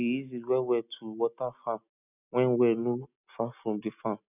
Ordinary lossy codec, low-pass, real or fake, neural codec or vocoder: none; 3.6 kHz; real; none